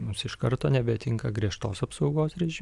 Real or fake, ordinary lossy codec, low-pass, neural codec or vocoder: real; Opus, 64 kbps; 10.8 kHz; none